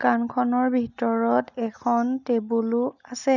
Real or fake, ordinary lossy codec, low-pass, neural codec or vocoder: real; none; 7.2 kHz; none